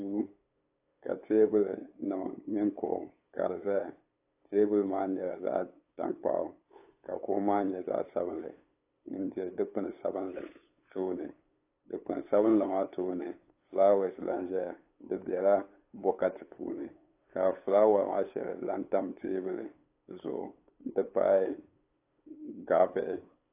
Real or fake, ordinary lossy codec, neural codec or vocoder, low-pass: fake; AAC, 24 kbps; codec, 16 kHz, 8 kbps, FunCodec, trained on LibriTTS, 25 frames a second; 3.6 kHz